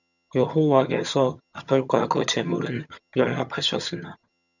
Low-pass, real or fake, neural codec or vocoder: 7.2 kHz; fake; vocoder, 22.05 kHz, 80 mel bands, HiFi-GAN